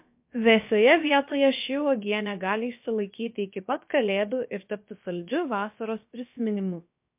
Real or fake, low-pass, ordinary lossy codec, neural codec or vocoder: fake; 3.6 kHz; MP3, 32 kbps; codec, 16 kHz, about 1 kbps, DyCAST, with the encoder's durations